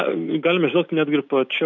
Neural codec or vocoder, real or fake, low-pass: none; real; 7.2 kHz